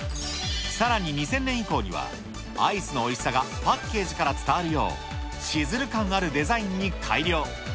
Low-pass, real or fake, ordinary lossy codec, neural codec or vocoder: none; real; none; none